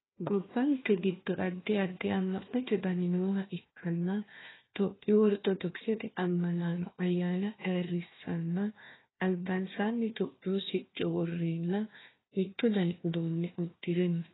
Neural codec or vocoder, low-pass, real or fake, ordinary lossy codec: codec, 16 kHz, 1 kbps, FunCodec, trained on Chinese and English, 50 frames a second; 7.2 kHz; fake; AAC, 16 kbps